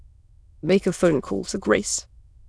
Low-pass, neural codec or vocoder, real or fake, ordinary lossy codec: none; autoencoder, 22.05 kHz, a latent of 192 numbers a frame, VITS, trained on many speakers; fake; none